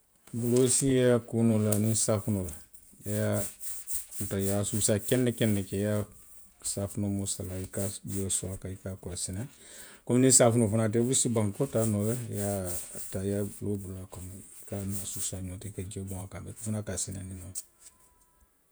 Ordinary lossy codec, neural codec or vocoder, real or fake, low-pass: none; vocoder, 48 kHz, 128 mel bands, Vocos; fake; none